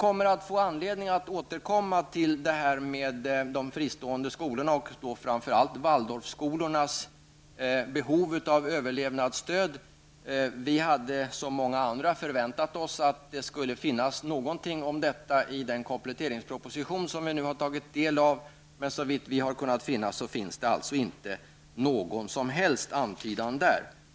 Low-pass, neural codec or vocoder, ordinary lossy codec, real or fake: none; none; none; real